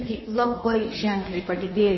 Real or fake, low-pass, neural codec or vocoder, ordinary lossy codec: fake; 7.2 kHz; codec, 16 kHz in and 24 kHz out, 1.1 kbps, FireRedTTS-2 codec; MP3, 24 kbps